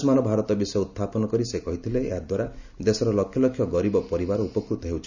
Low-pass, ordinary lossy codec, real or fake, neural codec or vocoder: 7.2 kHz; none; real; none